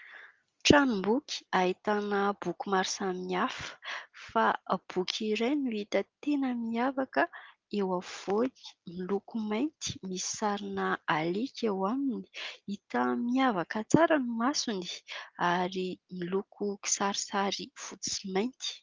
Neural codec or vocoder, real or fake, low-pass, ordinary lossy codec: none; real; 7.2 kHz; Opus, 32 kbps